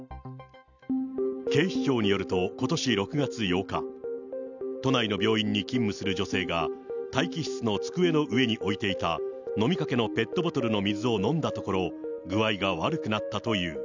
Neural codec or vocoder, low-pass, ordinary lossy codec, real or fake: none; 7.2 kHz; none; real